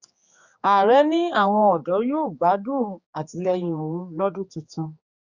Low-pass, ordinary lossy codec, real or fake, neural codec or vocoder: 7.2 kHz; Opus, 64 kbps; fake; codec, 16 kHz, 4 kbps, X-Codec, HuBERT features, trained on general audio